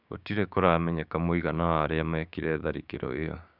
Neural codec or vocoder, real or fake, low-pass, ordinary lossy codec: codec, 16 kHz, 6 kbps, DAC; fake; 5.4 kHz; none